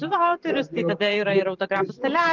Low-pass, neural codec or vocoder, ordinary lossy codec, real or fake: 7.2 kHz; vocoder, 44.1 kHz, 128 mel bands every 512 samples, BigVGAN v2; Opus, 24 kbps; fake